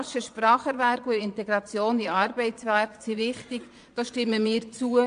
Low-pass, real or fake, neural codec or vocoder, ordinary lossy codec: 9.9 kHz; fake; vocoder, 22.05 kHz, 80 mel bands, Vocos; AAC, 64 kbps